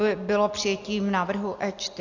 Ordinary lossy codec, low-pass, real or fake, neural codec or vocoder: AAC, 48 kbps; 7.2 kHz; real; none